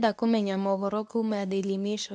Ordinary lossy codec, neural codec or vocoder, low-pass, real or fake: none; codec, 24 kHz, 0.9 kbps, WavTokenizer, medium speech release version 2; none; fake